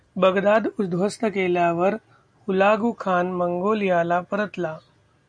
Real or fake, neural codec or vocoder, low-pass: real; none; 9.9 kHz